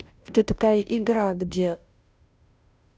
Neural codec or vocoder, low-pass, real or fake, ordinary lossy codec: codec, 16 kHz, 0.5 kbps, FunCodec, trained on Chinese and English, 25 frames a second; none; fake; none